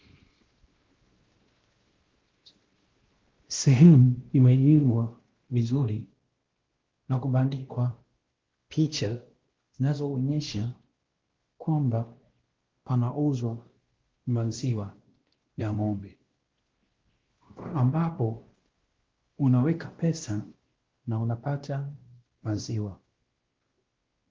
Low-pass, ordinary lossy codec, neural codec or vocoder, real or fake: 7.2 kHz; Opus, 16 kbps; codec, 16 kHz, 1 kbps, X-Codec, WavLM features, trained on Multilingual LibriSpeech; fake